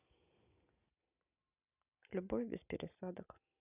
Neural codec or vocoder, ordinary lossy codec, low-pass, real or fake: none; none; 3.6 kHz; real